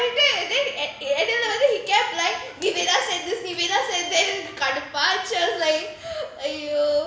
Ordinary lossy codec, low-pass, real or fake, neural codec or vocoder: none; none; real; none